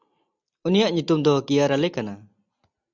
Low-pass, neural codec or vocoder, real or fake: 7.2 kHz; none; real